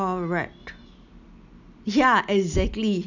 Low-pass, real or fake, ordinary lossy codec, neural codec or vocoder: 7.2 kHz; real; none; none